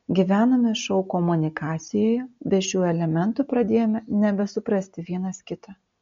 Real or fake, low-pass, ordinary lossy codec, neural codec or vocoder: real; 7.2 kHz; MP3, 48 kbps; none